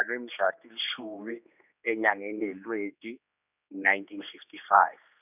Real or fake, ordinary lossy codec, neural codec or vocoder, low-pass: fake; none; codec, 16 kHz, 2 kbps, X-Codec, HuBERT features, trained on general audio; 3.6 kHz